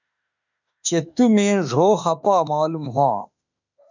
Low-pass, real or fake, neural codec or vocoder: 7.2 kHz; fake; autoencoder, 48 kHz, 32 numbers a frame, DAC-VAE, trained on Japanese speech